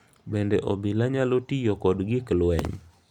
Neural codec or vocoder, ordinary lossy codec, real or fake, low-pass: none; none; real; 19.8 kHz